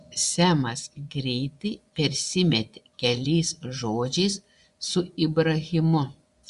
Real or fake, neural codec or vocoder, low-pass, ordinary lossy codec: fake; vocoder, 24 kHz, 100 mel bands, Vocos; 10.8 kHz; Opus, 64 kbps